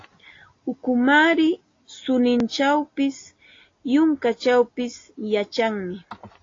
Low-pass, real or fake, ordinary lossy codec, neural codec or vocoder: 7.2 kHz; real; AAC, 32 kbps; none